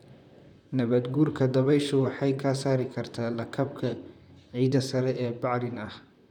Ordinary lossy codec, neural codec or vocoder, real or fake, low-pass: none; vocoder, 44.1 kHz, 128 mel bands, Pupu-Vocoder; fake; 19.8 kHz